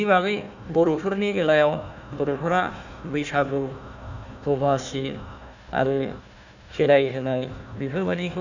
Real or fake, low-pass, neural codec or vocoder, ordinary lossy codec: fake; 7.2 kHz; codec, 16 kHz, 1 kbps, FunCodec, trained on Chinese and English, 50 frames a second; none